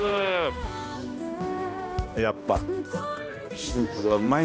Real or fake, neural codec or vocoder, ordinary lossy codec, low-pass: fake; codec, 16 kHz, 1 kbps, X-Codec, HuBERT features, trained on balanced general audio; none; none